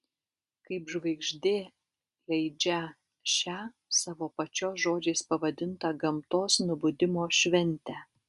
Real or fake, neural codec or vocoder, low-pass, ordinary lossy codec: real; none; 10.8 kHz; Opus, 64 kbps